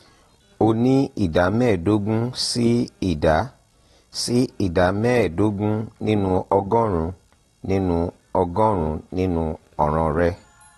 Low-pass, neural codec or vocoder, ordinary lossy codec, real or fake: 19.8 kHz; none; AAC, 32 kbps; real